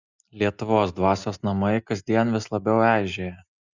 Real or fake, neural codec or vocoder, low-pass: real; none; 7.2 kHz